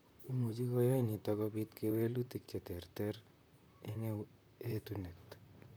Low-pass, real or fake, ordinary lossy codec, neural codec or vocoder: none; fake; none; vocoder, 44.1 kHz, 128 mel bands, Pupu-Vocoder